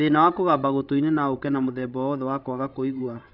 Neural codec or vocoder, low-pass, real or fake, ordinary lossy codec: codec, 16 kHz, 16 kbps, FreqCodec, larger model; 5.4 kHz; fake; none